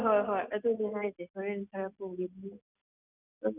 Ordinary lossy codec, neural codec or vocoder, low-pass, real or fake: none; none; 3.6 kHz; real